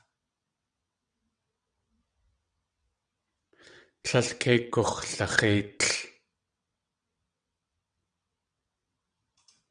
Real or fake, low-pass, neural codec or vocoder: fake; 9.9 kHz; vocoder, 22.05 kHz, 80 mel bands, WaveNeXt